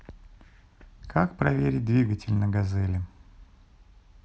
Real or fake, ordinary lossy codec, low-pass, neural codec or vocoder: real; none; none; none